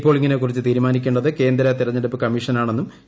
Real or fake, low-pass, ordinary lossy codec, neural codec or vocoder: real; none; none; none